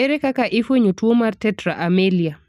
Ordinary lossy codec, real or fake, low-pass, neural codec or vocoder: none; real; 14.4 kHz; none